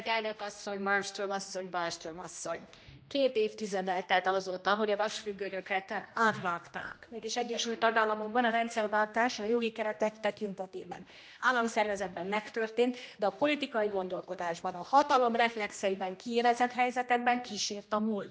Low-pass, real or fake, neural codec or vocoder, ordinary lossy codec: none; fake; codec, 16 kHz, 1 kbps, X-Codec, HuBERT features, trained on general audio; none